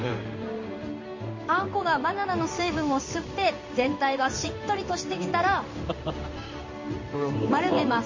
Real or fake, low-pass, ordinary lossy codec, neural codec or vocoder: fake; 7.2 kHz; MP3, 32 kbps; codec, 16 kHz in and 24 kHz out, 1 kbps, XY-Tokenizer